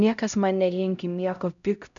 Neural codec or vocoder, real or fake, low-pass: codec, 16 kHz, 0.5 kbps, X-Codec, HuBERT features, trained on LibriSpeech; fake; 7.2 kHz